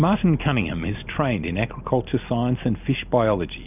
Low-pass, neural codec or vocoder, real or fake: 3.6 kHz; none; real